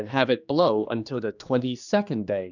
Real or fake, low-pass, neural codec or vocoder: fake; 7.2 kHz; codec, 16 kHz, 2 kbps, X-Codec, HuBERT features, trained on general audio